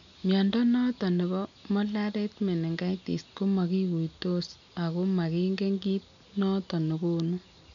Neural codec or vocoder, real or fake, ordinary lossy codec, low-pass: none; real; none; 7.2 kHz